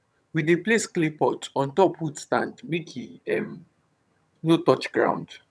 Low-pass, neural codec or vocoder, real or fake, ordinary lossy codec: none; vocoder, 22.05 kHz, 80 mel bands, HiFi-GAN; fake; none